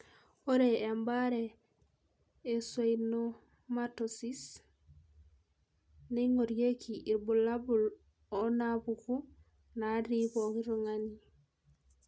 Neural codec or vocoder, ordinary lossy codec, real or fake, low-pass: none; none; real; none